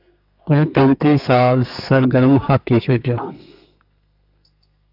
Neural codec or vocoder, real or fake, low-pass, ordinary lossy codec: codec, 32 kHz, 1.9 kbps, SNAC; fake; 5.4 kHz; AAC, 48 kbps